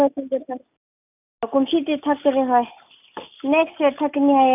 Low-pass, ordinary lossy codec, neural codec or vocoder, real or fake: 3.6 kHz; none; none; real